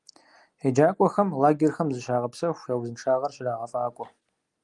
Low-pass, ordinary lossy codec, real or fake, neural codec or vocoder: 10.8 kHz; Opus, 32 kbps; real; none